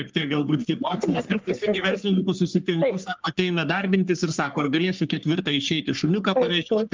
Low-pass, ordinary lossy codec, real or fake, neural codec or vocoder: 7.2 kHz; Opus, 32 kbps; fake; codec, 44.1 kHz, 3.4 kbps, Pupu-Codec